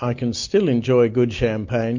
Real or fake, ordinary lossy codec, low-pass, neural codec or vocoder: real; MP3, 48 kbps; 7.2 kHz; none